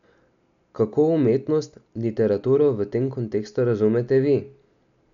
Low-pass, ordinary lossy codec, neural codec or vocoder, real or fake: 7.2 kHz; none; none; real